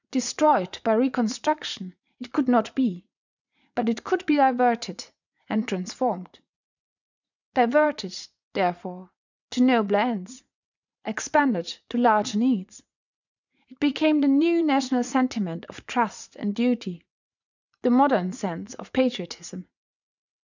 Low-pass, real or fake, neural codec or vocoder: 7.2 kHz; fake; vocoder, 44.1 kHz, 80 mel bands, Vocos